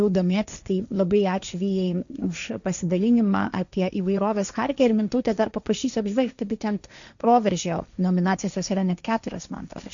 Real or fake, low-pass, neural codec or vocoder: fake; 7.2 kHz; codec, 16 kHz, 1.1 kbps, Voila-Tokenizer